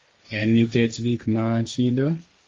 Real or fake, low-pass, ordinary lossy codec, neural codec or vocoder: fake; 7.2 kHz; Opus, 32 kbps; codec, 16 kHz, 1.1 kbps, Voila-Tokenizer